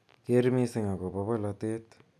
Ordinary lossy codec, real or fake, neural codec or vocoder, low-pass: none; real; none; none